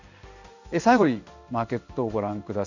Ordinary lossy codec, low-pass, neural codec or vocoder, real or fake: none; 7.2 kHz; codec, 16 kHz in and 24 kHz out, 1 kbps, XY-Tokenizer; fake